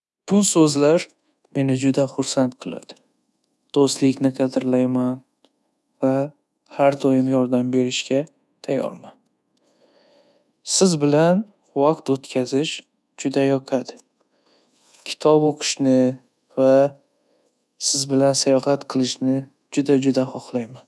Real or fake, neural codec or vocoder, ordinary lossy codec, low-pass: fake; codec, 24 kHz, 1.2 kbps, DualCodec; none; none